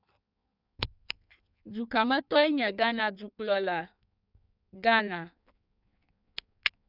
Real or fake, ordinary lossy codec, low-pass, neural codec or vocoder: fake; none; 5.4 kHz; codec, 16 kHz in and 24 kHz out, 1.1 kbps, FireRedTTS-2 codec